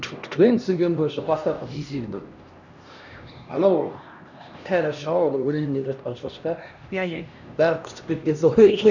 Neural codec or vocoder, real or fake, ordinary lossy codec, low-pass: codec, 16 kHz, 1 kbps, X-Codec, HuBERT features, trained on LibriSpeech; fake; none; 7.2 kHz